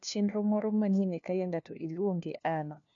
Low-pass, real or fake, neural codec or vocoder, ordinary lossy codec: 7.2 kHz; fake; codec, 16 kHz, 1 kbps, FunCodec, trained on LibriTTS, 50 frames a second; AAC, 48 kbps